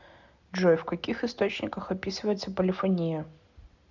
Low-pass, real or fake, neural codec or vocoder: 7.2 kHz; real; none